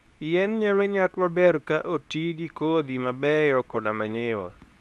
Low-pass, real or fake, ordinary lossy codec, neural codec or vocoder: none; fake; none; codec, 24 kHz, 0.9 kbps, WavTokenizer, medium speech release version 2